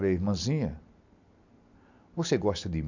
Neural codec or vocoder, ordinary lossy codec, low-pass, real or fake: none; none; 7.2 kHz; real